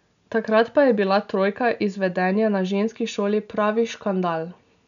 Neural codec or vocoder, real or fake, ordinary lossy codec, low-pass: none; real; none; 7.2 kHz